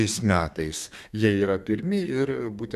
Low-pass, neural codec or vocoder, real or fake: 14.4 kHz; codec, 32 kHz, 1.9 kbps, SNAC; fake